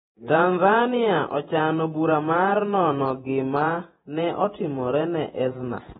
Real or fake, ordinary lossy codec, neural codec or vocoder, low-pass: fake; AAC, 16 kbps; vocoder, 48 kHz, 128 mel bands, Vocos; 19.8 kHz